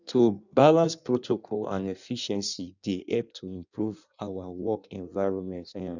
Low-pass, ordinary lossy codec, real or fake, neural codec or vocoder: 7.2 kHz; none; fake; codec, 16 kHz in and 24 kHz out, 1.1 kbps, FireRedTTS-2 codec